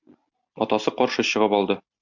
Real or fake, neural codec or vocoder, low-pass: real; none; 7.2 kHz